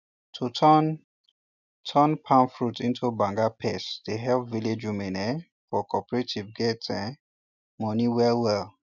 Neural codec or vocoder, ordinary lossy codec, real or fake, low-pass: none; none; real; 7.2 kHz